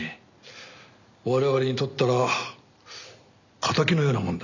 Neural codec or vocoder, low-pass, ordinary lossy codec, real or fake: none; 7.2 kHz; none; real